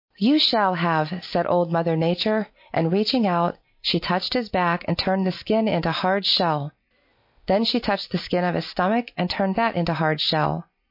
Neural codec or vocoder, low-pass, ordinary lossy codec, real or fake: none; 5.4 kHz; MP3, 32 kbps; real